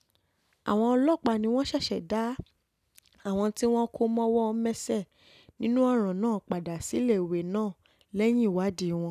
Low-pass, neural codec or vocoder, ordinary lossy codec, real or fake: 14.4 kHz; none; none; real